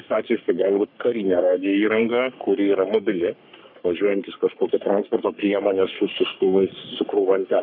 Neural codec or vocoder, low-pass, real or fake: codec, 44.1 kHz, 3.4 kbps, Pupu-Codec; 5.4 kHz; fake